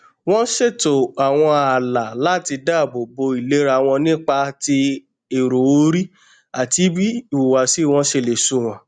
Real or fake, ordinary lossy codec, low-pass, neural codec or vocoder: real; none; 9.9 kHz; none